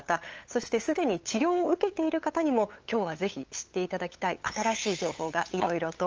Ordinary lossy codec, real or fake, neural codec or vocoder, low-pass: Opus, 24 kbps; fake; codec, 16 kHz, 8 kbps, FunCodec, trained on LibriTTS, 25 frames a second; 7.2 kHz